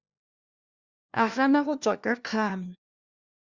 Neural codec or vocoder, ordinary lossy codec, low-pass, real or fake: codec, 16 kHz, 1 kbps, FunCodec, trained on LibriTTS, 50 frames a second; Opus, 64 kbps; 7.2 kHz; fake